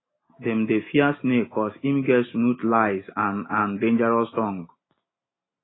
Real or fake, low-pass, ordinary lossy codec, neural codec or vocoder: real; 7.2 kHz; AAC, 16 kbps; none